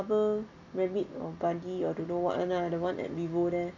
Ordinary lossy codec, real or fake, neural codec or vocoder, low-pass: none; real; none; 7.2 kHz